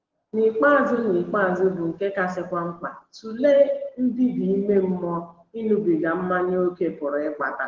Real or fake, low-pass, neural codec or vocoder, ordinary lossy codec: real; 7.2 kHz; none; Opus, 16 kbps